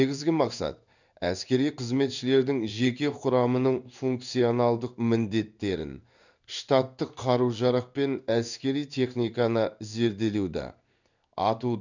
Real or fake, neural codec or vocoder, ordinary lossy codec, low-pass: fake; codec, 16 kHz in and 24 kHz out, 1 kbps, XY-Tokenizer; none; 7.2 kHz